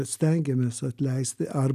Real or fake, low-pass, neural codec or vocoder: real; 14.4 kHz; none